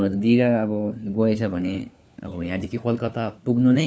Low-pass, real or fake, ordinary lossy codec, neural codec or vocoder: none; fake; none; codec, 16 kHz, 4 kbps, FunCodec, trained on LibriTTS, 50 frames a second